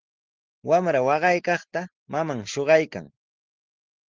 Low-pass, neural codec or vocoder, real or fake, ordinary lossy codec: 7.2 kHz; none; real; Opus, 16 kbps